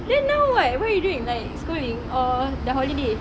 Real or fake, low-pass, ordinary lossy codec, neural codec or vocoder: real; none; none; none